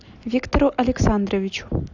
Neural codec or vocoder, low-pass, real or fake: none; 7.2 kHz; real